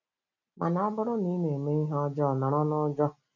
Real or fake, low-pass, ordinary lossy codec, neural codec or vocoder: real; 7.2 kHz; none; none